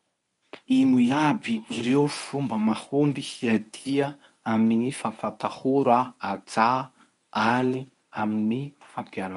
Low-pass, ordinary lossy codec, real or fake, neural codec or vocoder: 10.8 kHz; none; fake; codec, 24 kHz, 0.9 kbps, WavTokenizer, medium speech release version 1